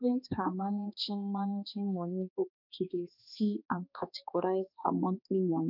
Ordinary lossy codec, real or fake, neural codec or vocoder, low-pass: none; fake; codec, 16 kHz, 2 kbps, X-Codec, HuBERT features, trained on balanced general audio; 5.4 kHz